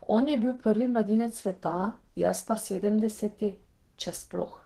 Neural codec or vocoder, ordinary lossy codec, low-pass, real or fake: codec, 32 kHz, 1.9 kbps, SNAC; Opus, 16 kbps; 14.4 kHz; fake